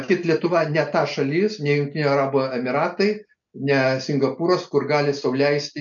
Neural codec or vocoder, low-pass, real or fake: none; 7.2 kHz; real